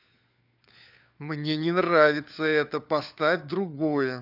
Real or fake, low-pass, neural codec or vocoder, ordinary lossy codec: fake; 5.4 kHz; codec, 16 kHz, 4 kbps, FunCodec, trained on LibriTTS, 50 frames a second; none